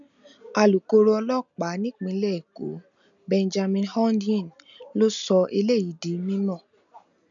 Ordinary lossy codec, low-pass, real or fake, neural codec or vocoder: none; 7.2 kHz; real; none